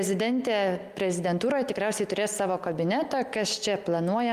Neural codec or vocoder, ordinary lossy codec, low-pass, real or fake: none; Opus, 32 kbps; 14.4 kHz; real